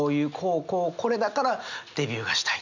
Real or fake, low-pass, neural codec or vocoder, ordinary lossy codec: real; 7.2 kHz; none; none